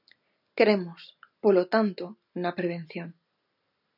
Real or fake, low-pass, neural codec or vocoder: real; 5.4 kHz; none